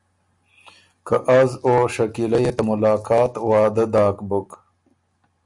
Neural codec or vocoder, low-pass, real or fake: none; 10.8 kHz; real